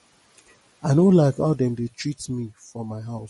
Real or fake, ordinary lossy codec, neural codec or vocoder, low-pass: real; MP3, 48 kbps; none; 19.8 kHz